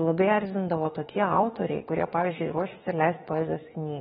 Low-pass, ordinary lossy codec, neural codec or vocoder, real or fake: 19.8 kHz; AAC, 16 kbps; autoencoder, 48 kHz, 32 numbers a frame, DAC-VAE, trained on Japanese speech; fake